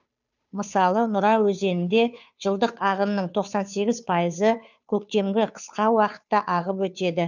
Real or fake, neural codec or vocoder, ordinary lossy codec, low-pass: fake; codec, 16 kHz, 2 kbps, FunCodec, trained on Chinese and English, 25 frames a second; none; 7.2 kHz